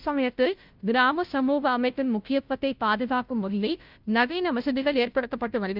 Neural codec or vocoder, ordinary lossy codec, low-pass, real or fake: codec, 16 kHz, 0.5 kbps, FunCodec, trained on Chinese and English, 25 frames a second; Opus, 24 kbps; 5.4 kHz; fake